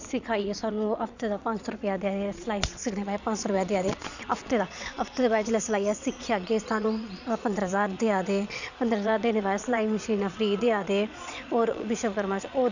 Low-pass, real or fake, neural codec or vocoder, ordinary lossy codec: 7.2 kHz; fake; vocoder, 22.05 kHz, 80 mel bands, Vocos; none